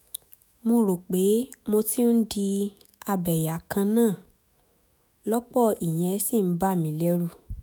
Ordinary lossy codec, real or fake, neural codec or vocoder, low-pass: none; fake; autoencoder, 48 kHz, 128 numbers a frame, DAC-VAE, trained on Japanese speech; none